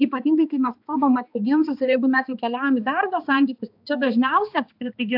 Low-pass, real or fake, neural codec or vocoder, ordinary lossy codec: 5.4 kHz; fake; codec, 16 kHz, 2 kbps, X-Codec, HuBERT features, trained on balanced general audio; AAC, 48 kbps